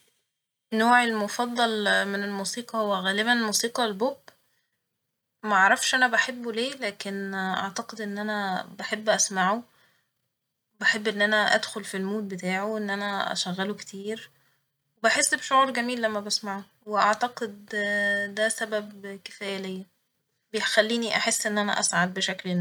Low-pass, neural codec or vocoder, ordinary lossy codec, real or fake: none; none; none; real